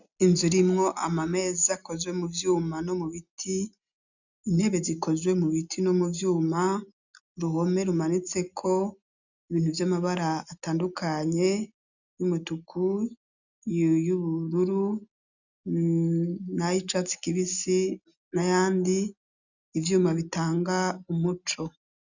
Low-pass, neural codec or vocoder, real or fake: 7.2 kHz; none; real